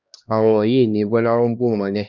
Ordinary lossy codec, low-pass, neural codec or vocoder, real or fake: none; 7.2 kHz; codec, 16 kHz, 1 kbps, X-Codec, HuBERT features, trained on LibriSpeech; fake